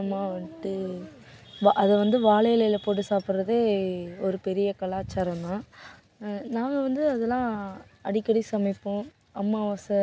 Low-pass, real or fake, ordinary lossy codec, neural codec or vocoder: none; real; none; none